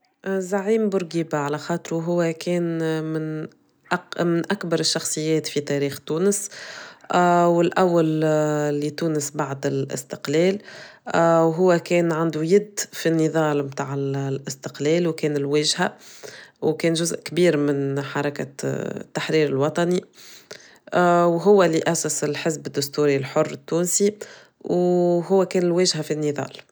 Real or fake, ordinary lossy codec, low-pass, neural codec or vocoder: real; none; none; none